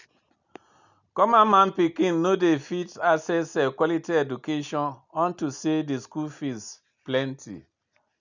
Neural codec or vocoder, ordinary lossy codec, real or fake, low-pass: none; none; real; 7.2 kHz